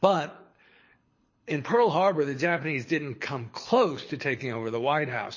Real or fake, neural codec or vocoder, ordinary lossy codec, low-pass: fake; codec, 24 kHz, 6 kbps, HILCodec; MP3, 32 kbps; 7.2 kHz